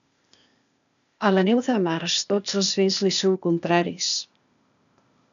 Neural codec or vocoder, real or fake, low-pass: codec, 16 kHz, 0.8 kbps, ZipCodec; fake; 7.2 kHz